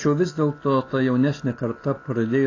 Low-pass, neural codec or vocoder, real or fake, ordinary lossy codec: 7.2 kHz; none; real; AAC, 32 kbps